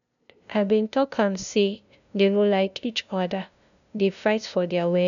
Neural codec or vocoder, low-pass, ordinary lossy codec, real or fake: codec, 16 kHz, 0.5 kbps, FunCodec, trained on LibriTTS, 25 frames a second; 7.2 kHz; none; fake